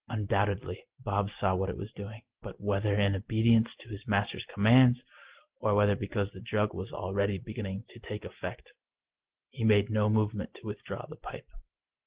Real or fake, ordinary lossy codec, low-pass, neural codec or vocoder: real; Opus, 16 kbps; 3.6 kHz; none